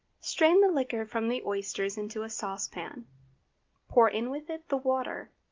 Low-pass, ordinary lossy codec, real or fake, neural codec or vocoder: 7.2 kHz; Opus, 32 kbps; real; none